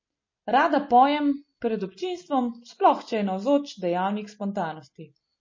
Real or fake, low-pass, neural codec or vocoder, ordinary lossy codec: real; 7.2 kHz; none; MP3, 32 kbps